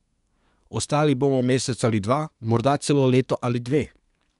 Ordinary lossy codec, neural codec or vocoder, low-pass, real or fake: none; codec, 24 kHz, 1 kbps, SNAC; 10.8 kHz; fake